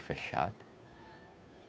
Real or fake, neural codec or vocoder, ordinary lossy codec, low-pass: fake; codec, 16 kHz, 2 kbps, FunCodec, trained on Chinese and English, 25 frames a second; none; none